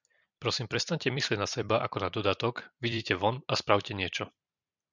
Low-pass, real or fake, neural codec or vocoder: 7.2 kHz; fake; vocoder, 44.1 kHz, 128 mel bands every 256 samples, BigVGAN v2